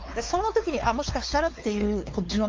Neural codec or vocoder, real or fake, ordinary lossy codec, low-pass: codec, 16 kHz, 4 kbps, X-Codec, WavLM features, trained on Multilingual LibriSpeech; fake; Opus, 32 kbps; 7.2 kHz